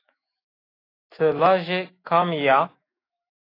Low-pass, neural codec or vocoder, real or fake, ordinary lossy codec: 5.4 kHz; autoencoder, 48 kHz, 128 numbers a frame, DAC-VAE, trained on Japanese speech; fake; AAC, 24 kbps